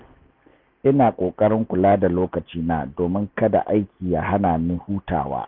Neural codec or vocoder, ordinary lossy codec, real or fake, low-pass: none; none; real; 5.4 kHz